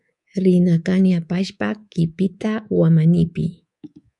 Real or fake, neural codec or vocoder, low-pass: fake; codec, 24 kHz, 3.1 kbps, DualCodec; 10.8 kHz